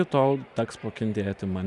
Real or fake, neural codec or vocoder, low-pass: fake; vocoder, 44.1 kHz, 128 mel bands every 512 samples, BigVGAN v2; 10.8 kHz